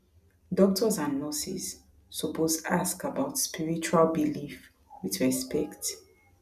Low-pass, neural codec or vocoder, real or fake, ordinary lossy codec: 14.4 kHz; none; real; none